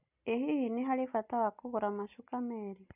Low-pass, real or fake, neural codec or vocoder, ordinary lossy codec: 3.6 kHz; real; none; none